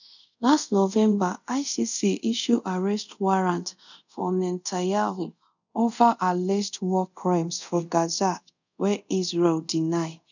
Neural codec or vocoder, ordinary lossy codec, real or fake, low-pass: codec, 24 kHz, 0.5 kbps, DualCodec; none; fake; 7.2 kHz